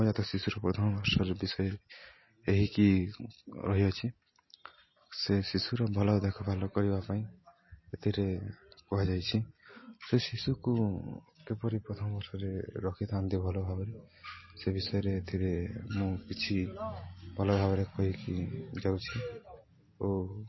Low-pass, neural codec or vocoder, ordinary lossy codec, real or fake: 7.2 kHz; none; MP3, 24 kbps; real